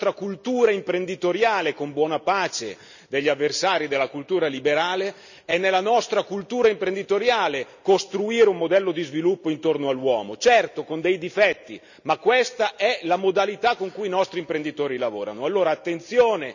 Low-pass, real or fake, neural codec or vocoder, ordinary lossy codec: 7.2 kHz; real; none; none